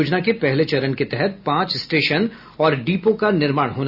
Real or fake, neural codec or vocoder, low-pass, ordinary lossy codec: real; none; 5.4 kHz; none